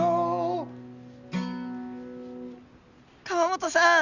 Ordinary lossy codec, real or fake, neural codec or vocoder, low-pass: Opus, 64 kbps; real; none; 7.2 kHz